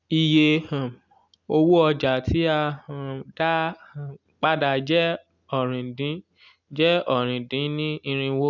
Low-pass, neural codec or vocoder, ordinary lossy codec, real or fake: 7.2 kHz; none; none; real